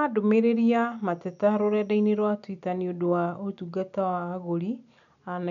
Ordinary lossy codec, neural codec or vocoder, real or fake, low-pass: none; none; real; 7.2 kHz